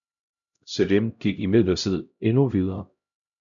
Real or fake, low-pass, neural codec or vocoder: fake; 7.2 kHz; codec, 16 kHz, 0.5 kbps, X-Codec, HuBERT features, trained on LibriSpeech